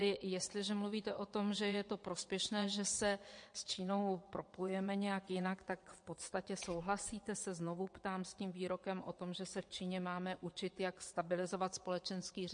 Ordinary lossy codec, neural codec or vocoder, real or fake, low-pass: MP3, 48 kbps; vocoder, 22.05 kHz, 80 mel bands, WaveNeXt; fake; 9.9 kHz